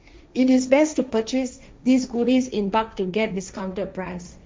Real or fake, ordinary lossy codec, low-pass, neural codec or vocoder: fake; none; none; codec, 16 kHz, 1.1 kbps, Voila-Tokenizer